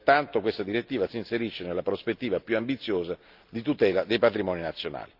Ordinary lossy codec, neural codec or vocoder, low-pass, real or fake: Opus, 24 kbps; none; 5.4 kHz; real